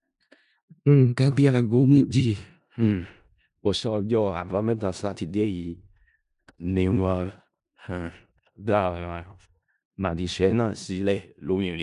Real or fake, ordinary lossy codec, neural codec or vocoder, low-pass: fake; none; codec, 16 kHz in and 24 kHz out, 0.4 kbps, LongCat-Audio-Codec, four codebook decoder; 10.8 kHz